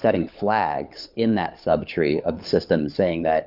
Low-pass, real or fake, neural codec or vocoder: 5.4 kHz; fake; codec, 16 kHz, 4 kbps, FunCodec, trained on LibriTTS, 50 frames a second